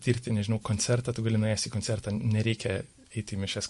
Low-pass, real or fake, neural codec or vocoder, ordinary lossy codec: 14.4 kHz; real; none; MP3, 48 kbps